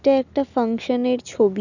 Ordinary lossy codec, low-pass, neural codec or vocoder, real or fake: none; 7.2 kHz; none; real